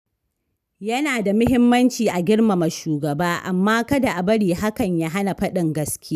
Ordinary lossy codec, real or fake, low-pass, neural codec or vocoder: none; real; 14.4 kHz; none